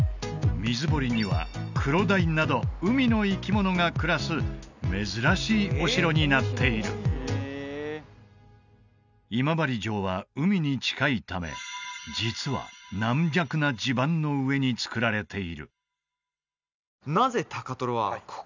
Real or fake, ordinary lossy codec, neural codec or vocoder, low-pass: real; none; none; 7.2 kHz